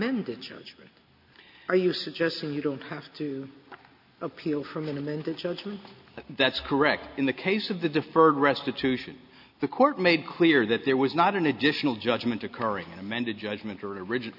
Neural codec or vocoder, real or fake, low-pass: none; real; 5.4 kHz